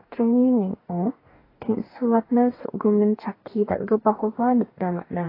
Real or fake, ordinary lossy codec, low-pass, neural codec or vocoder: fake; AAC, 24 kbps; 5.4 kHz; codec, 44.1 kHz, 2.6 kbps, DAC